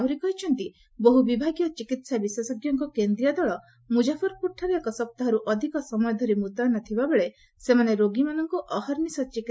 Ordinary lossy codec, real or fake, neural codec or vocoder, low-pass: none; real; none; none